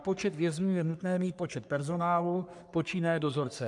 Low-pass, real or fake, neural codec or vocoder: 10.8 kHz; fake; codec, 44.1 kHz, 3.4 kbps, Pupu-Codec